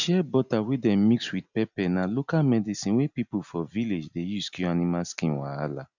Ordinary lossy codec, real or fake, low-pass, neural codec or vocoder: none; real; 7.2 kHz; none